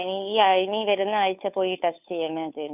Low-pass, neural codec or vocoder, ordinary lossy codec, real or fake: 3.6 kHz; codec, 16 kHz, 2 kbps, FunCodec, trained on Chinese and English, 25 frames a second; none; fake